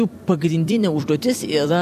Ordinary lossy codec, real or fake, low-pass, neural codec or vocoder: AAC, 96 kbps; fake; 14.4 kHz; vocoder, 44.1 kHz, 128 mel bands every 256 samples, BigVGAN v2